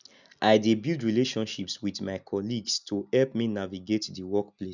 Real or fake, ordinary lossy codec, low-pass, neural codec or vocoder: real; none; 7.2 kHz; none